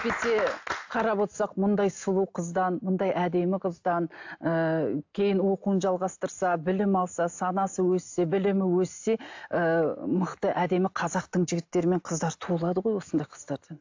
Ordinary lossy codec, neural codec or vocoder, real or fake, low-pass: AAC, 48 kbps; none; real; 7.2 kHz